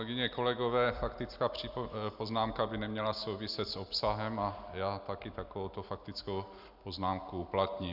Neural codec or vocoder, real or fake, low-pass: none; real; 5.4 kHz